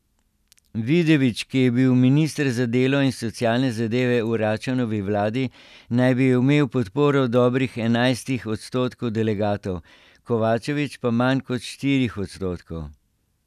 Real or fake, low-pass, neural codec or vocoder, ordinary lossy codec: real; 14.4 kHz; none; none